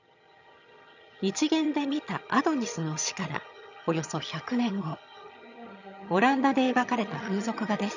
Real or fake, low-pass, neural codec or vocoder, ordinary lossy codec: fake; 7.2 kHz; vocoder, 22.05 kHz, 80 mel bands, HiFi-GAN; none